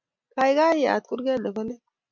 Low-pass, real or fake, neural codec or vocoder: 7.2 kHz; real; none